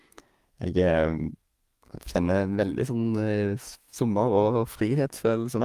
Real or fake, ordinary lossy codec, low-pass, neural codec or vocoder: fake; Opus, 32 kbps; 14.4 kHz; codec, 32 kHz, 1.9 kbps, SNAC